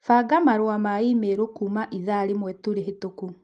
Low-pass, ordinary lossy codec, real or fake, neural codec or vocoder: 10.8 kHz; Opus, 24 kbps; real; none